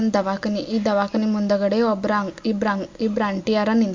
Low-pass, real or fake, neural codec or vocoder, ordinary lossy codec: 7.2 kHz; real; none; MP3, 48 kbps